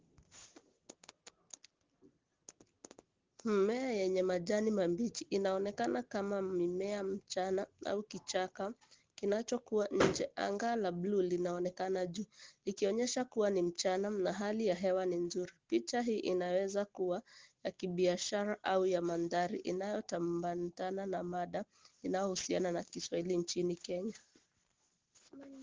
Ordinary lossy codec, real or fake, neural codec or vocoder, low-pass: Opus, 16 kbps; real; none; 7.2 kHz